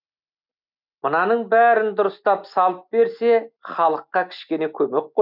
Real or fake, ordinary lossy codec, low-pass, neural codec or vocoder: real; none; 5.4 kHz; none